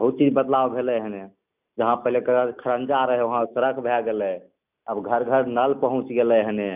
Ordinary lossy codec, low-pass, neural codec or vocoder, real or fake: none; 3.6 kHz; none; real